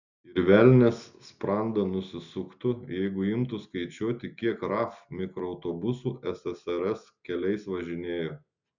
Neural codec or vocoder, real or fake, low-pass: none; real; 7.2 kHz